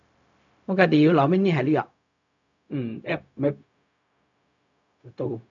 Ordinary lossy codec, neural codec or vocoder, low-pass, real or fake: none; codec, 16 kHz, 0.4 kbps, LongCat-Audio-Codec; 7.2 kHz; fake